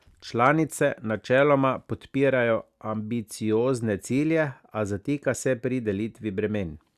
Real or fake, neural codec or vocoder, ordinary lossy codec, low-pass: real; none; Opus, 64 kbps; 14.4 kHz